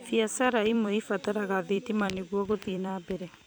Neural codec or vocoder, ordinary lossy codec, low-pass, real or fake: vocoder, 44.1 kHz, 128 mel bands every 256 samples, BigVGAN v2; none; none; fake